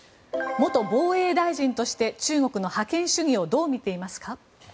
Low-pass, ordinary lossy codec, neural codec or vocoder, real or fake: none; none; none; real